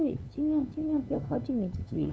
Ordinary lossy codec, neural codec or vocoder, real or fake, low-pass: none; codec, 16 kHz, 2 kbps, FunCodec, trained on LibriTTS, 25 frames a second; fake; none